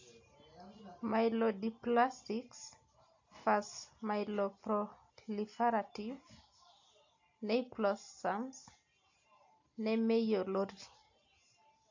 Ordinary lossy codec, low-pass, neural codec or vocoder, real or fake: AAC, 48 kbps; 7.2 kHz; none; real